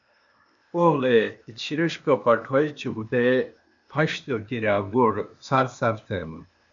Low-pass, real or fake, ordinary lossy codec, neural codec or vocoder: 7.2 kHz; fake; MP3, 64 kbps; codec, 16 kHz, 0.8 kbps, ZipCodec